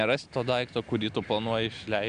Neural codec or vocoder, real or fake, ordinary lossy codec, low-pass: none; real; Opus, 64 kbps; 9.9 kHz